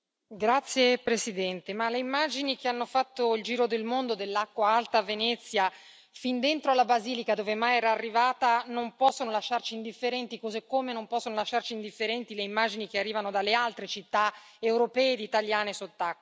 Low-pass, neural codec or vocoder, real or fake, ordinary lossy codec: none; none; real; none